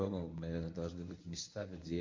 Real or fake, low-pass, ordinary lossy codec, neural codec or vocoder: fake; 7.2 kHz; MP3, 48 kbps; codec, 24 kHz, 0.9 kbps, WavTokenizer, medium speech release version 1